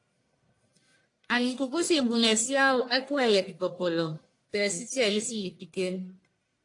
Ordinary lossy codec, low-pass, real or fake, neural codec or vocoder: AAC, 48 kbps; 10.8 kHz; fake; codec, 44.1 kHz, 1.7 kbps, Pupu-Codec